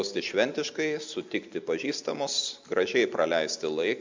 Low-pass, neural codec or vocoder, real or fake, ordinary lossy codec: 7.2 kHz; none; real; MP3, 64 kbps